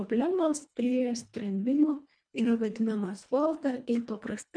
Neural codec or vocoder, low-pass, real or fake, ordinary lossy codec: codec, 24 kHz, 1.5 kbps, HILCodec; 9.9 kHz; fake; MP3, 64 kbps